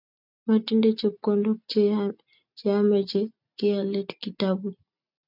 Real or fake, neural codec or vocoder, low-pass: real; none; 5.4 kHz